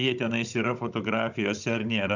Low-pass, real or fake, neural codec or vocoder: 7.2 kHz; fake; codec, 16 kHz, 16 kbps, FunCodec, trained on Chinese and English, 50 frames a second